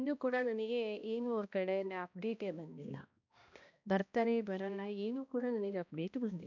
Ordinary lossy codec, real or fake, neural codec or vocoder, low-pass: none; fake; codec, 16 kHz, 1 kbps, X-Codec, HuBERT features, trained on balanced general audio; 7.2 kHz